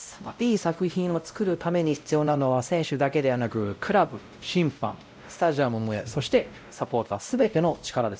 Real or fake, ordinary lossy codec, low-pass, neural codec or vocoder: fake; none; none; codec, 16 kHz, 0.5 kbps, X-Codec, WavLM features, trained on Multilingual LibriSpeech